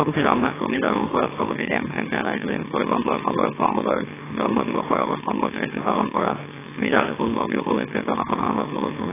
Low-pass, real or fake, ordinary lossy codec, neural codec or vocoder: 3.6 kHz; fake; AAC, 16 kbps; autoencoder, 44.1 kHz, a latent of 192 numbers a frame, MeloTTS